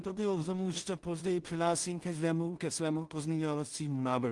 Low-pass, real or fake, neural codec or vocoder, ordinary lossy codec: 10.8 kHz; fake; codec, 16 kHz in and 24 kHz out, 0.4 kbps, LongCat-Audio-Codec, two codebook decoder; Opus, 24 kbps